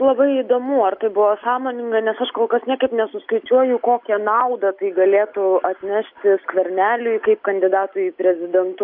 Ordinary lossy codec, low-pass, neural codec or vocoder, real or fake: MP3, 48 kbps; 5.4 kHz; none; real